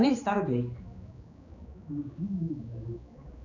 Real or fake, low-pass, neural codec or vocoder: fake; 7.2 kHz; codec, 16 kHz, 2 kbps, X-Codec, HuBERT features, trained on general audio